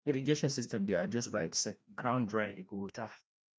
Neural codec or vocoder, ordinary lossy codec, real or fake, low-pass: codec, 16 kHz, 1 kbps, FreqCodec, larger model; none; fake; none